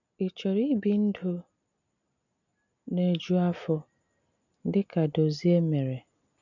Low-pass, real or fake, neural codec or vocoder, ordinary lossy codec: 7.2 kHz; real; none; none